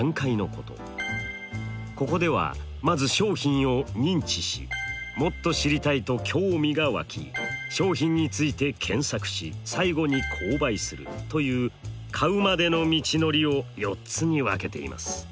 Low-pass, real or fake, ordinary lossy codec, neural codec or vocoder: none; real; none; none